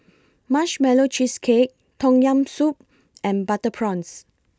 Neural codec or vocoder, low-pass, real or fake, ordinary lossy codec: none; none; real; none